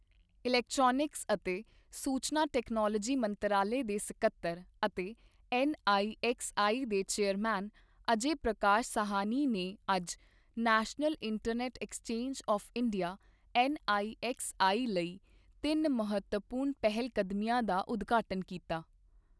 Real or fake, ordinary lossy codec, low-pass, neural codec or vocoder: real; none; none; none